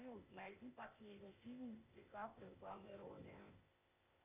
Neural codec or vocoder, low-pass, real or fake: codec, 16 kHz, 0.8 kbps, ZipCodec; 3.6 kHz; fake